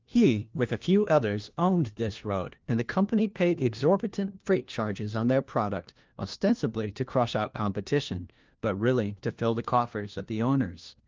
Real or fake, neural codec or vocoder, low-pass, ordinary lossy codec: fake; codec, 16 kHz, 1 kbps, FunCodec, trained on LibriTTS, 50 frames a second; 7.2 kHz; Opus, 32 kbps